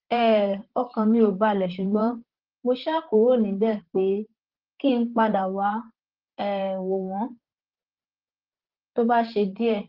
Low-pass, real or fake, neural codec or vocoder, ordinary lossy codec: 5.4 kHz; fake; vocoder, 44.1 kHz, 128 mel bands, Pupu-Vocoder; Opus, 16 kbps